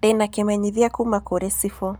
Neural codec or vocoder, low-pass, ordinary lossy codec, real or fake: none; none; none; real